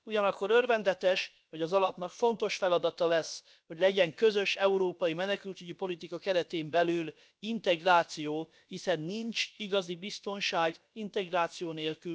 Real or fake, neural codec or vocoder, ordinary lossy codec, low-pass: fake; codec, 16 kHz, about 1 kbps, DyCAST, with the encoder's durations; none; none